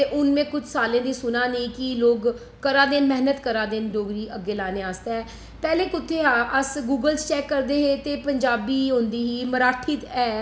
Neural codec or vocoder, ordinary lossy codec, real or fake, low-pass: none; none; real; none